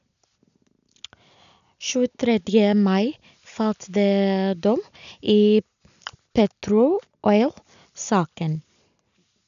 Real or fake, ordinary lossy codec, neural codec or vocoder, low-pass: real; none; none; 7.2 kHz